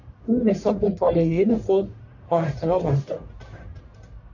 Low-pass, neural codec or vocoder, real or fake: 7.2 kHz; codec, 44.1 kHz, 1.7 kbps, Pupu-Codec; fake